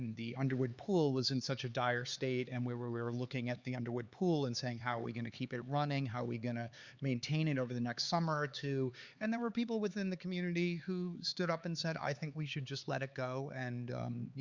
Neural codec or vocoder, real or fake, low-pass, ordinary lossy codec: codec, 16 kHz, 4 kbps, X-Codec, HuBERT features, trained on LibriSpeech; fake; 7.2 kHz; Opus, 64 kbps